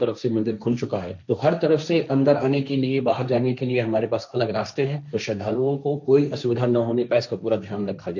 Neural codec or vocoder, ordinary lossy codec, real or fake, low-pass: codec, 16 kHz, 1.1 kbps, Voila-Tokenizer; none; fake; 7.2 kHz